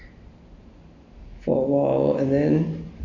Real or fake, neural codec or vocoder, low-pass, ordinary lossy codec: real; none; 7.2 kHz; none